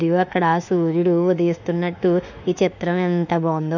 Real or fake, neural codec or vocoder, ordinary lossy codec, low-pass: fake; autoencoder, 48 kHz, 32 numbers a frame, DAC-VAE, trained on Japanese speech; none; 7.2 kHz